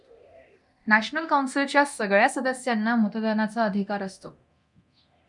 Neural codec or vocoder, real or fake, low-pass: codec, 24 kHz, 0.9 kbps, DualCodec; fake; 10.8 kHz